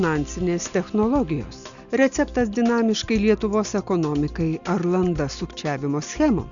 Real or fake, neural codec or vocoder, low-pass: real; none; 7.2 kHz